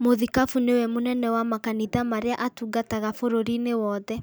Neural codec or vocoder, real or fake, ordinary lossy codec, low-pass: none; real; none; none